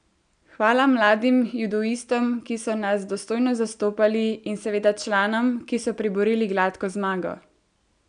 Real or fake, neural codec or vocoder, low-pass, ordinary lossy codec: real; none; 9.9 kHz; none